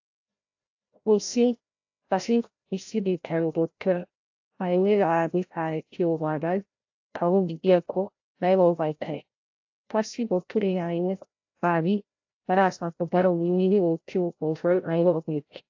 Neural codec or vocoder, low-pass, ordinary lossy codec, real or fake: codec, 16 kHz, 0.5 kbps, FreqCodec, larger model; 7.2 kHz; AAC, 48 kbps; fake